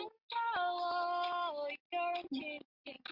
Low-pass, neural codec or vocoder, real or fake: 5.4 kHz; none; real